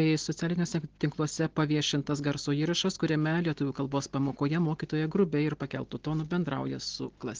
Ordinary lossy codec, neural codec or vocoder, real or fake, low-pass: Opus, 16 kbps; none; real; 7.2 kHz